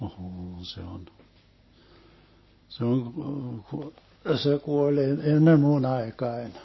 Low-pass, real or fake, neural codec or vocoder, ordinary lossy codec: 7.2 kHz; real; none; MP3, 24 kbps